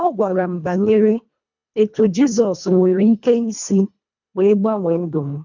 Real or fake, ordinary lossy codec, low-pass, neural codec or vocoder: fake; none; 7.2 kHz; codec, 24 kHz, 1.5 kbps, HILCodec